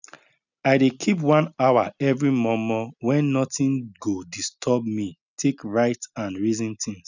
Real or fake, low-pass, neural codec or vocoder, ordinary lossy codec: real; 7.2 kHz; none; none